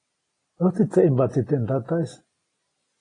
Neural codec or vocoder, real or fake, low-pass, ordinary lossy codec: none; real; 9.9 kHz; AAC, 32 kbps